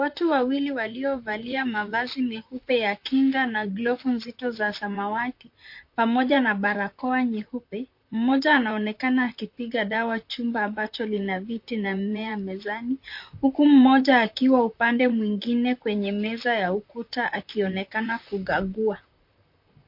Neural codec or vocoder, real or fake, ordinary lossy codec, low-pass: vocoder, 44.1 kHz, 128 mel bands, Pupu-Vocoder; fake; MP3, 32 kbps; 5.4 kHz